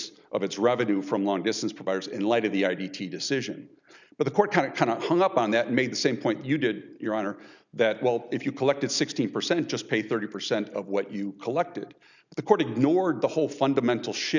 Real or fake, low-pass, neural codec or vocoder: real; 7.2 kHz; none